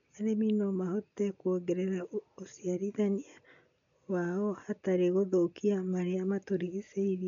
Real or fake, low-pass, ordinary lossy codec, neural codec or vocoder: real; 7.2 kHz; none; none